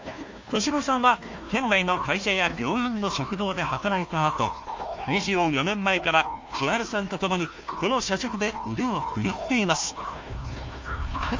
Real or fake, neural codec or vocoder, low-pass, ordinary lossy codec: fake; codec, 16 kHz, 1 kbps, FunCodec, trained on Chinese and English, 50 frames a second; 7.2 kHz; MP3, 48 kbps